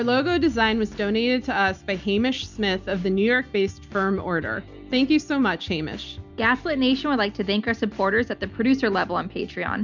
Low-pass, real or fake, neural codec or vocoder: 7.2 kHz; real; none